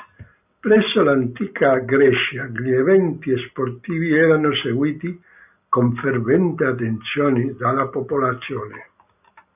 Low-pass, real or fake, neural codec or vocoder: 3.6 kHz; real; none